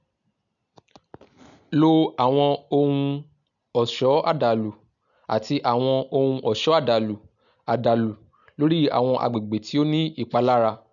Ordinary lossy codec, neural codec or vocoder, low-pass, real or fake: none; none; 7.2 kHz; real